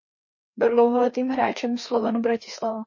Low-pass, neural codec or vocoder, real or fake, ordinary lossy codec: 7.2 kHz; codec, 16 kHz, 2 kbps, FreqCodec, larger model; fake; MP3, 32 kbps